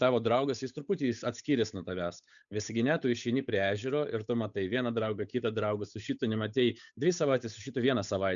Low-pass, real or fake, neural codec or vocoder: 7.2 kHz; fake; codec, 16 kHz, 8 kbps, FunCodec, trained on Chinese and English, 25 frames a second